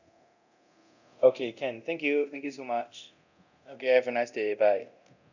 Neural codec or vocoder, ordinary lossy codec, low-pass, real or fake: codec, 24 kHz, 0.9 kbps, DualCodec; none; 7.2 kHz; fake